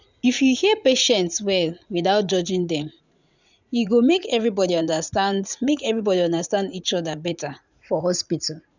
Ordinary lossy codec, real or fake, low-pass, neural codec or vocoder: none; fake; 7.2 kHz; vocoder, 44.1 kHz, 80 mel bands, Vocos